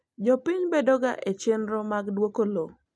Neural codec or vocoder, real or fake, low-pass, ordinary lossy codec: none; real; none; none